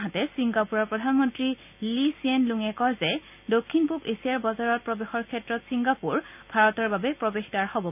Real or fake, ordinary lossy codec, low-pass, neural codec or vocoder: real; none; 3.6 kHz; none